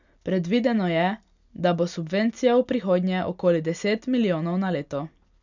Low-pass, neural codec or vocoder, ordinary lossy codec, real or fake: 7.2 kHz; none; none; real